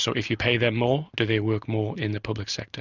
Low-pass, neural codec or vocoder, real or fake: 7.2 kHz; none; real